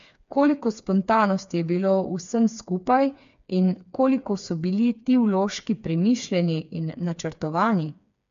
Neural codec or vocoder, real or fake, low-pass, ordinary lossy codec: codec, 16 kHz, 4 kbps, FreqCodec, smaller model; fake; 7.2 kHz; MP3, 64 kbps